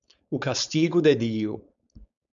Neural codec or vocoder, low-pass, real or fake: codec, 16 kHz, 4.8 kbps, FACodec; 7.2 kHz; fake